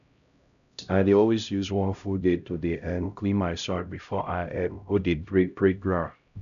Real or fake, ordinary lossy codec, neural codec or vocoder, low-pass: fake; none; codec, 16 kHz, 0.5 kbps, X-Codec, HuBERT features, trained on LibriSpeech; 7.2 kHz